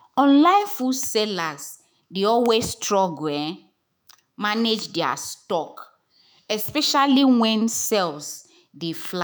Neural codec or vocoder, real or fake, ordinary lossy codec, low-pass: autoencoder, 48 kHz, 128 numbers a frame, DAC-VAE, trained on Japanese speech; fake; none; none